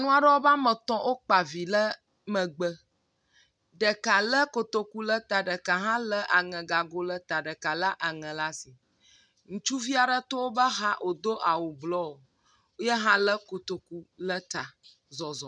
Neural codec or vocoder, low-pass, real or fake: none; 9.9 kHz; real